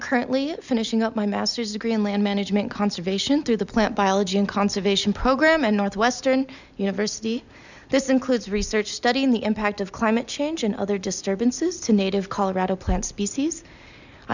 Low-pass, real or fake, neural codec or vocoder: 7.2 kHz; real; none